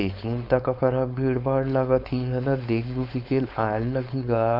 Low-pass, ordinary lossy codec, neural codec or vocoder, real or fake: 5.4 kHz; none; codec, 16 kHz, 4.8 kbps, FACodec; fake